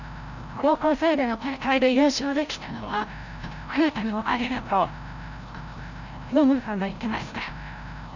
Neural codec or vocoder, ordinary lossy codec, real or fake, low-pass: codec, 16 kHz, 0.5 kbps, FreqCodec, larger model; none; fake; 7.2 kHz